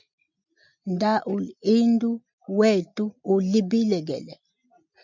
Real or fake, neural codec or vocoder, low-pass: real; none; 7.2 kHz